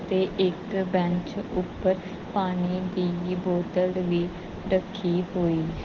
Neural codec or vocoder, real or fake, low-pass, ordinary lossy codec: none; real; 7.2 kHz; Opus, 16 kbps